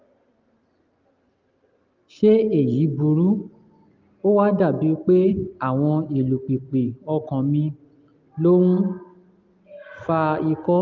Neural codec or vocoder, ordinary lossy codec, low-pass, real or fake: none; Opus, 32 kbps; 7.2 kHz; real